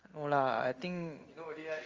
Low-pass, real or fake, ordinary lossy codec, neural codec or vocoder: 7.2 kHz; fake; Opus, 32 kbps; codec, 16 kHz in and 24 kHz out, 1 kbps, XY-Tokenizer